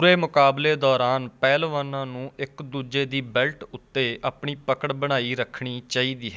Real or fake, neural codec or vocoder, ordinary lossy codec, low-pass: real; none; none; none